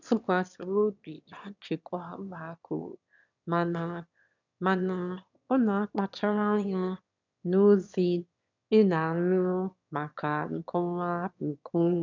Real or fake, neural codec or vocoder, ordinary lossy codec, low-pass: fake; autoencoder, 22.05 kHz, a latent of 192 numbers a frame, VITS, trained on one speaker; none; 7.2 kHz